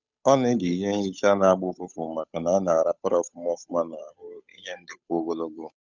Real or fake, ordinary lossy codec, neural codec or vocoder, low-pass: fake; none; codec, 16 kHz, 8 kbps, FunCodec, trained on Chinese and English, 25 frames a second; 7.2 kHz